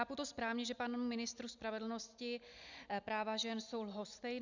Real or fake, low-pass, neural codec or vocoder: real; 7.2 kHz; none